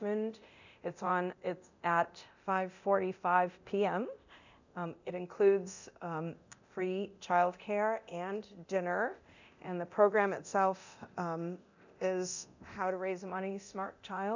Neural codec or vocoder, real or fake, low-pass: codec, 24 kHz, 0.9 kbps, DualCodec; fake; 7.2 kHz